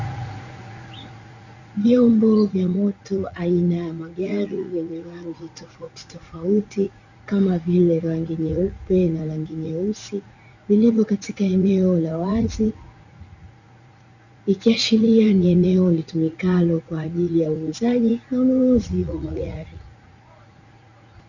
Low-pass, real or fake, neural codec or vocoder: 7.2 kHz; fake; vocoder, 44.1 kHz, 80 mel bands, Vocos